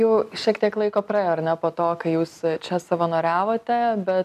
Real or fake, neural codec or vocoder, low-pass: real; none; 14.4 kHz